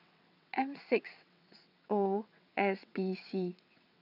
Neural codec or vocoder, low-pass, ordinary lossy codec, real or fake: vocoder, 22.05 kHz, 80 mel bands, WaveNeXt; 5.4 kHz; none; fake